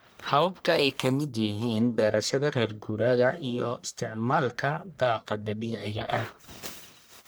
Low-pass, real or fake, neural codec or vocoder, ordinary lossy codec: none; fake; codec, 44.1 kHz, 1.7 kbps, Pupu-Codec; none